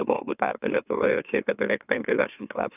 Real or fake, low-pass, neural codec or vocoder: fake; 3.6 kHz; autoencoder, 44.1 kHz, a latent of 192 numbers a frame, MeloTTS